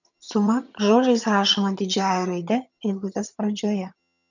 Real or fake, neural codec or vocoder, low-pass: fake; vocoder, 22.05 kHz, 80 mel bands, HiFi-GAN; 7.2 kHz